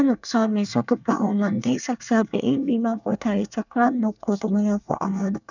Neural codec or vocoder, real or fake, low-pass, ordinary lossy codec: codec, 24 kHz, 1 kbps, SNAC; fake; 7.2 kHz; none